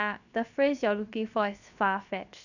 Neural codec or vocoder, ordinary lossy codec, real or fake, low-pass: codec, 16 kHz, 0.7 kbps, FocalCodec; none; fake; 7.2 kHz